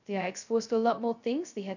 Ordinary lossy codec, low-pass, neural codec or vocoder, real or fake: none; 7.2 kHz; codec, 16 kHz, 0.2 kbps, FocalCodec; fake